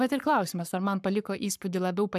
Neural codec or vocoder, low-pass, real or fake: codec, 44.1 kHz, 7.8 kbps, Pupu-Codec; 14.4 kHz; fake